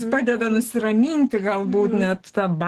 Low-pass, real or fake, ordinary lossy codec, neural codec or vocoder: 14.4 kHz; fake; Opus, 16 kbps; codec, 44.1 kHz, 7.8 kbps, DAC